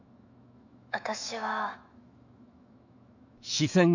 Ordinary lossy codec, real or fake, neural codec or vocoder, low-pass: none; fake; autoencoder, 48 kHz, 128 numbers a frame, DAC-VAE, trained on Japanese speech; 7.2 kHz